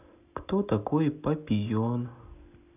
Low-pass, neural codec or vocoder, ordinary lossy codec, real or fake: 3.6 kHz; none; none; real